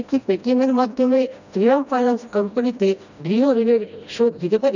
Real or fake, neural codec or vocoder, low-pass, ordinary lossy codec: fake; codec, 16 kHz, 1 kbps, FreqCodec, smaller model; 7.2 kHz; none